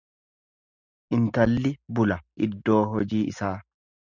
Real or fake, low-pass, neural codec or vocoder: real; 7.2 kHz; none